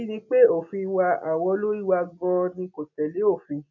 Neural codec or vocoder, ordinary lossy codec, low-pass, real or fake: none; none; 7.2 kHz; real